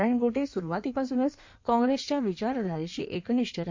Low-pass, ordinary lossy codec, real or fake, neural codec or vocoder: 7.2 kHz; MP3, 48 kbps; fake; codec, 16 kHz in and 24 kHz out, 1.1 kbps, FireRedTTS-2 codec